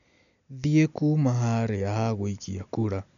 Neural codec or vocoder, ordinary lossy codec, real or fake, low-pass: none; none; real; 7.2 kHz